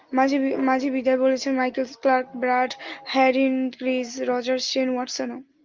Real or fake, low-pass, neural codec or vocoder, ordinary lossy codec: real; 7.2 kHz; none; Opus, 24 kbps